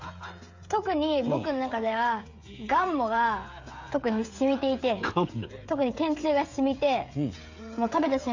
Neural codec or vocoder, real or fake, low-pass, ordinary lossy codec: codec, 16 kHz, 16 kbps, FreqCodec, smaller model; fake; 7.2 kHz; AAC, 48 kbps